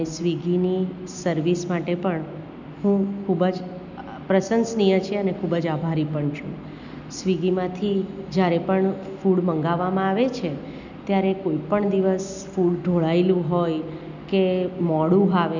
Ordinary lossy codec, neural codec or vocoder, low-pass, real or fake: none; none; 7.2 kHz; real